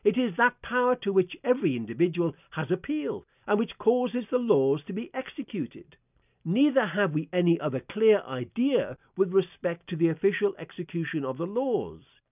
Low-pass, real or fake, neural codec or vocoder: 3.6 kHz; real; none